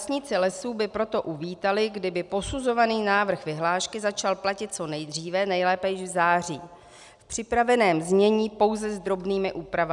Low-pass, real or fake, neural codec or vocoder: 10.8 kHz; real; none